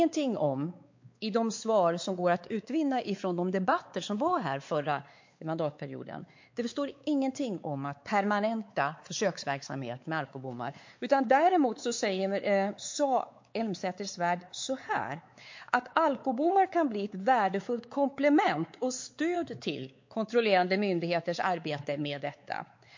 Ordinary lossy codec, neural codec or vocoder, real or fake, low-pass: MP3, 48 kbps; codec, 16 kHz, 4 kbps, X-Codec, WavLM features, trained on Multilingual LibriSpeech; fake; 7.2 kHz